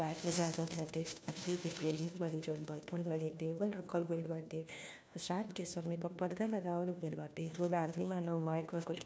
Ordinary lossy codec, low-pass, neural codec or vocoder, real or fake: none; none; codec, 16 kHz, 1 kbps, FunCodec, trained on LibriTTS, 50 frames a second; fake